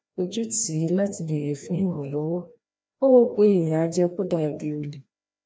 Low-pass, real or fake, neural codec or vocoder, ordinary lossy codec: none; fake; codec, 16 kHz, 1 kbps, FreqCodec, larger model; none